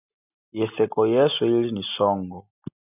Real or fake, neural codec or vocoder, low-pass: real; none; 3.6 kHz